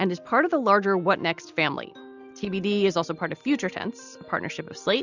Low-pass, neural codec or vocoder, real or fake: 7.2 kHz; none; real